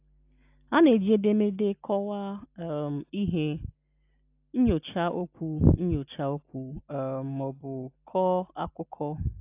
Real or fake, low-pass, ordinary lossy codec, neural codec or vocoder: real; 3.6 kHz; AAC, 32 kbps; none